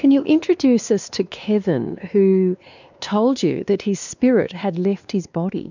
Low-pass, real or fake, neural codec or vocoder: 7.2 kHz; fake; codec, 16 kHz, 2 kbps, X-Codec, WavLM features, trained on Multilingual LibriSpeech